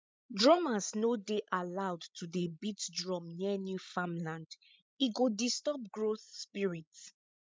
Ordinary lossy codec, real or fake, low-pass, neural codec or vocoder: none; fake; none; codec, 16 kHz, 16 kbps, FreqCodec, larger model